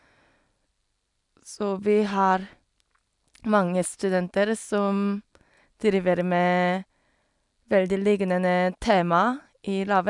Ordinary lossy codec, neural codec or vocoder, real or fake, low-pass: none; none; real; 10.8 kHz